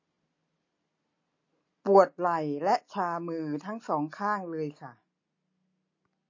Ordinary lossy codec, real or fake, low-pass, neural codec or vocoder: MP3, 32 kbps; real; 7.2 kHz; none